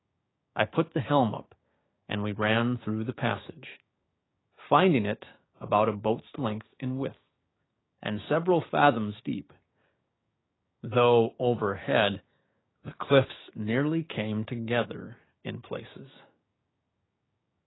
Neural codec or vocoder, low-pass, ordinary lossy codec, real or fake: codec, 16 kHz, 6 kbps, DAC; 7.2 kHz; AAC, 16 kbps; fake